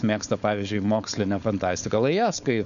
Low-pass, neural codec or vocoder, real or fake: 7.2 kHz; codec, 16 kHz, 4.8 kbps, FACodec; fake